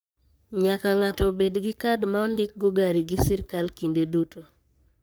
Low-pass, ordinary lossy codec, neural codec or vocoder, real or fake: none; none; codec, 44.1 kHz, 3.4 kbps, Pupu-Codec; fake